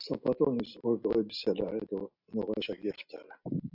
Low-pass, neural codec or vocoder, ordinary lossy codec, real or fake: 5.4 kHz; none; AAC, 48 kbps; real